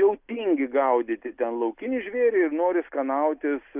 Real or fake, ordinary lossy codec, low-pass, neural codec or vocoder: real; Opus, 64 kbps; 3.6 kHz; none